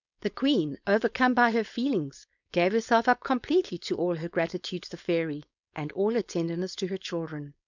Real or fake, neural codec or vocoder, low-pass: fake; codec, 16 kHz, 4.8 kbps, FACodec; 7.2 kHz